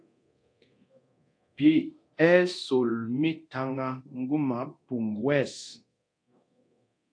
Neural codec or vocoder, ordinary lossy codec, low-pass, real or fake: codec, 24 kHz, 0.9 kbps, DualCodec; AAC, 48 kbps; 9.9 kHz; fake